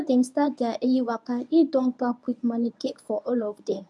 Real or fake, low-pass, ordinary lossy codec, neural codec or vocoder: fake; none; none; codec, 24 kHz, 0.9 kbps, WavTokenizer, medium speech release version 1